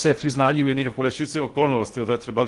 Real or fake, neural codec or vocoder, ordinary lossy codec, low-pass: fake; codec, 16 kHz in and 24 kHz out, 0.6 kbps, FocalCodec, streaming, 2048 codes; Opus, 24 kbps; 10.8 kHz